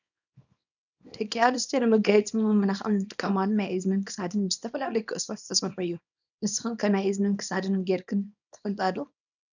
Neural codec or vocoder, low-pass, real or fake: codec, 24 kHz, 0.9 kbps, WavTokenizer, small release; 7.2 kHz; fake